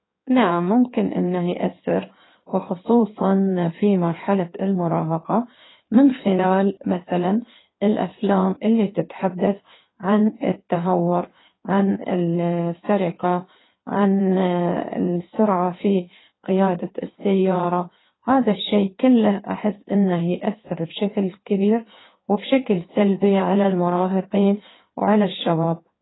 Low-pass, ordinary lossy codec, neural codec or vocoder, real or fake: 7.2 kHz; AAC, 16 kbps; codec, 16 kHz in and 24 kHz out, 1.1 kbps, FireRedTTS-2 codec; fake